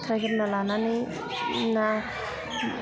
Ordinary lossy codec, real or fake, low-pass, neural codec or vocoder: none; real; none; none